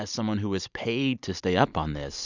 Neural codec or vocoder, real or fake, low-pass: vocoder, 44.1 kHz, 128 mel bands every 512 samples, BigVGAN v2; fake; 7.2 kHz